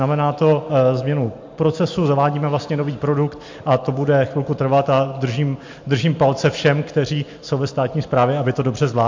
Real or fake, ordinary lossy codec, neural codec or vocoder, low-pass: real; MP3, 48 kbps; none; 7.2 kHz